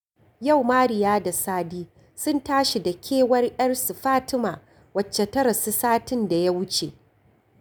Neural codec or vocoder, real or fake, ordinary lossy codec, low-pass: none; real; none; none